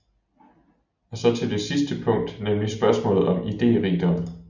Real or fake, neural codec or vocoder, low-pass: real; none; 7.2 kHz